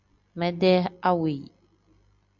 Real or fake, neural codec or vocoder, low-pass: real; none; 7.2 kHz